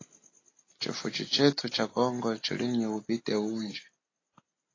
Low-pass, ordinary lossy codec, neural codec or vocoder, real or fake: 7.2 kHz; AAC, 32 kbps; none; real